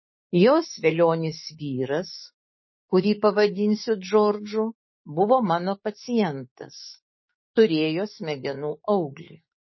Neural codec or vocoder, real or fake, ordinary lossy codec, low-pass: codec, 16 kHz, 6 kbps, DAC; fake; MP3, 24 kbps; 7.2 kHz